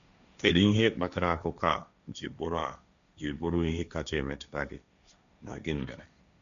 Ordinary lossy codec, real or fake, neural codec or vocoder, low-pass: none; fake; codec, 16 kHz, 1.1 kbps, Voila-Tokenizer; 7.2 kHz